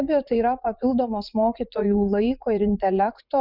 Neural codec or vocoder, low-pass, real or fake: vocoder, 44.1 kHz, 80 mel bands, Vocos; 5.4 kHz; fake